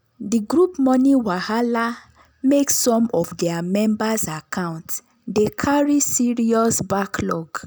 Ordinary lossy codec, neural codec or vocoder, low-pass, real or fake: none; none; none; real